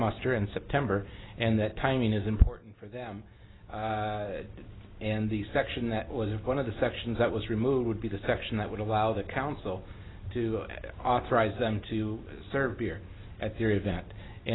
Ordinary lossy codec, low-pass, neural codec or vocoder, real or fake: AAC, 16 kbps; 7.2 kHz; none; real